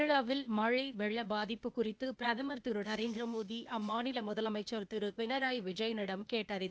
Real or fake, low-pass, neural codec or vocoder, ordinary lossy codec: fake; none; codec, 16 kHz, 0.8 kbps, ZipCodec; none